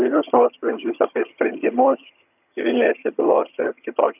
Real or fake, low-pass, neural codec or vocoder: fake; 3.6 kHz; vocoder, 22.05 kHz, 80 mel bands, HiFi-GAN